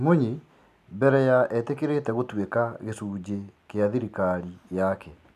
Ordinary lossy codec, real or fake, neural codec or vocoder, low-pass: none; real; none; 14.4 kHz